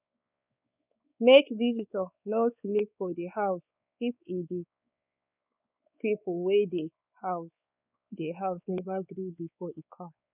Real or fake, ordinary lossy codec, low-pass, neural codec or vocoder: fake; none; 3.6 kHz; codec, 16 kHz, 4 kbps, X-Codec, WavLM features, trained on Multilingual LibriSpeech